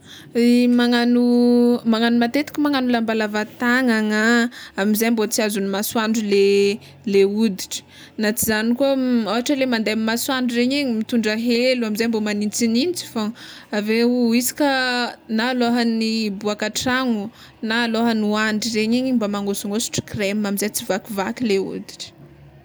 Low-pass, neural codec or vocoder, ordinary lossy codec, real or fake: none; none; none; real